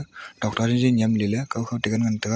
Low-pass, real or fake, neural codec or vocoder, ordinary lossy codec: none; real; none; none